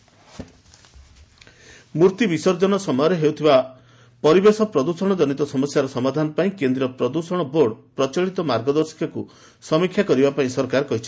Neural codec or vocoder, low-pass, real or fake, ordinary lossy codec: none; none; real; none